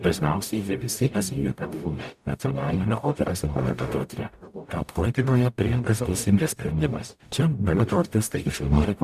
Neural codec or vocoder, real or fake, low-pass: codec, 44.1 kHz, 0.9 kbps, DAC; fake; 14.4 kHz